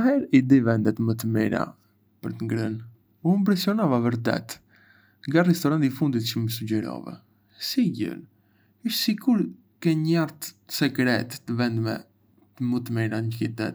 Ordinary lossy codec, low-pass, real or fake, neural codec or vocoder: none; none; real; none